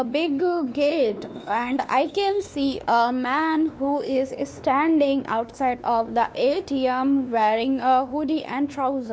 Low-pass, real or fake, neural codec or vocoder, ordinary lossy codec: none; fake; codec, 16 kHz, 2 kbps, X-Codec, WavLM features, trained on Multilingual LibriSpeech; none